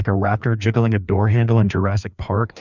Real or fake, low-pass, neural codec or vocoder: fake; 7.2 kHz; codec, 16 kHz, 2 kbps, FreqCodec, larger model